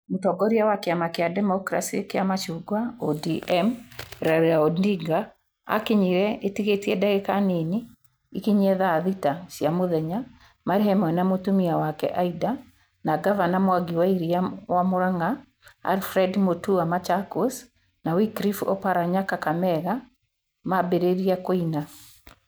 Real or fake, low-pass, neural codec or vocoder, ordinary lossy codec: real; none; none; none